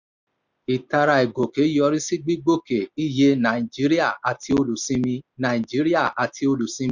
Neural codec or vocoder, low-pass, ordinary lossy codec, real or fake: none; 7.2 kHz; none; real